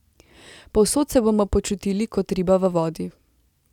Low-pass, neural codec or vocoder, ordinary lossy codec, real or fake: 19.8 kHz; none; none; real